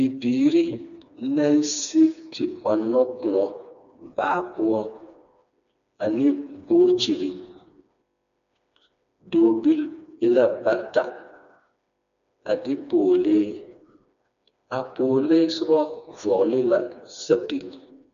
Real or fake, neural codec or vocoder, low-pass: fake; codec, 16 kHz, 2 kbps, FreqCodec, smaller model; 7.2 kHz